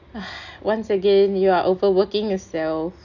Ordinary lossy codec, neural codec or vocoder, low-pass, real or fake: none; none; 7.2 kHz; real